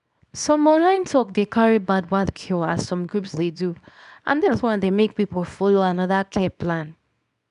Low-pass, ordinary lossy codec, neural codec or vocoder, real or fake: 10.8 kHz; none; codec, 24 kHz, 0.9 kbps, WavTokenizer, small release; fake